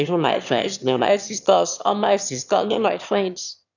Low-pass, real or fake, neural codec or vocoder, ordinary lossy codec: 7.2 kHz; fake; autoencoder, 22.05 kHz, a latent of 192 numbers a frame, VITS, trained on one speaker; none